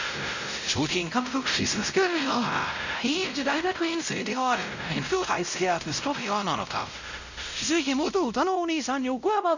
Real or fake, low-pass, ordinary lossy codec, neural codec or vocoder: fake; 7.2 kHz; none; codec, 16 kHz, 0.5 kbps, X-Codec, WavLM features, trained on Multilingual LibriSpeech